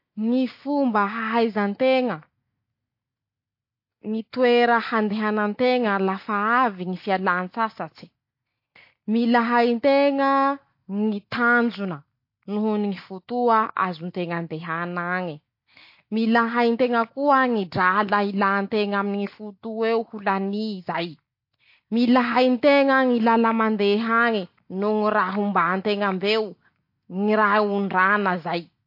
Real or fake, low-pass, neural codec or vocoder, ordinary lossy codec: real; 5.4 kHz; none; MP3, 32 kbps